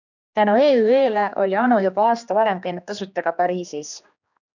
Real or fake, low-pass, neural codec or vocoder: fake; 7.2 kHz; codec, 16 kHz, 2 kbps, X-Codec, HuBERT features, trained on general audio